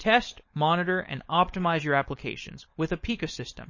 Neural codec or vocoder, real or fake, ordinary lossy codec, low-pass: codec, 16 kHz, 4.8 kbps, FACodec; fake; MP3, 32 kbps; 7.2 kHz